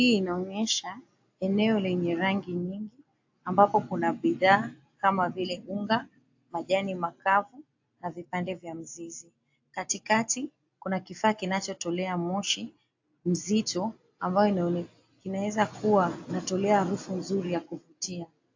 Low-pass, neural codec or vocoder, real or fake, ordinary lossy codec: 7.2 kHz; none; real; AAC, 48 kbps